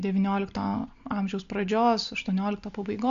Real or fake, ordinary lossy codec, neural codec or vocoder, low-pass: real; AAC, 64 kbps; none; 7.2 kHz